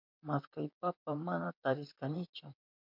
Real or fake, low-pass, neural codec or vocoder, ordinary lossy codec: fake; 5.4 kHz; vocoder, 44.1 kHz, 128 mel bands, Pupu-Vocoder; MP3, 48 kbps